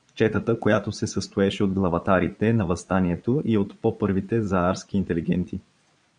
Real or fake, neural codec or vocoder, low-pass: fake; vocoder, 22.05 kHz, 80 mel bands, Vocos; 9.9 kHz